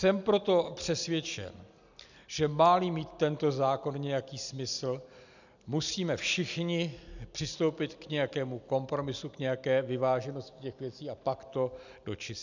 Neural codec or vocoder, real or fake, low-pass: none; real; 7.2 kHz